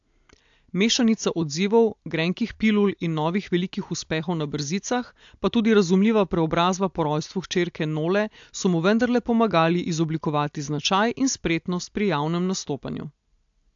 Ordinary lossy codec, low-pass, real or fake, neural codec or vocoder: AAC, 64 kbps; 7.2 kHz; real; none